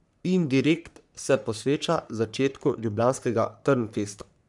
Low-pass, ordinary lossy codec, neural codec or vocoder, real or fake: 10.8 kHz; MP3, 96 kbps; codec, 44.1 kHz, 3.4 kbps, Pupu-Codec; fake